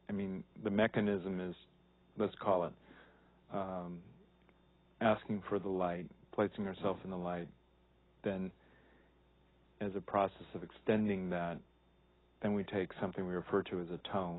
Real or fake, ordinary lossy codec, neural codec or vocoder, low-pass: real; AAC, 16 kbps; none; 7.2 kHz